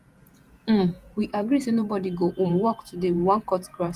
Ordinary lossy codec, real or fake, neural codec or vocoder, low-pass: Opus, 32 kbps; fake; vocoder, 48 kHz, 128 mel bands, Vocos; 14.4 kHz